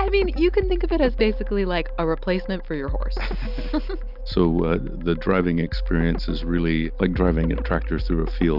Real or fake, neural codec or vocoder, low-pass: real; none; 5.4 kHz